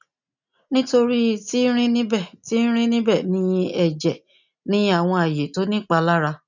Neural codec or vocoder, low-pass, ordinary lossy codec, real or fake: none; 7.2 kHz; none; real